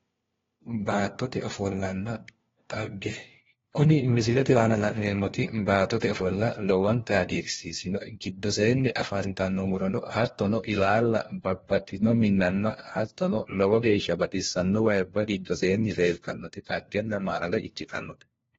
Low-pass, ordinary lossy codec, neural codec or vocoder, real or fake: 7.2 kHz; AAC, 24 kbps; codec, 16 kHz, 1 kbps, FunCodec, trained on LibriTTS, 50 frames a second; fake